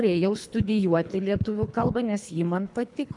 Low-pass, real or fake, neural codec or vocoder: 10.8 kHz; fake; codec, 24 kHz, 3 kbps, HILCodec